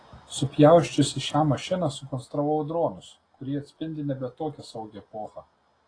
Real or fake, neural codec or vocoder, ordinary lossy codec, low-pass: real; none; AAC, 32 kbps; 9.9 kHz